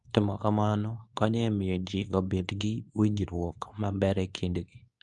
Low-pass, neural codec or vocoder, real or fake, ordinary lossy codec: 10.8 kHz; codec, 24 kHz, 0.9 kbps, WavTokenizer, medium speech release version 2; fake; none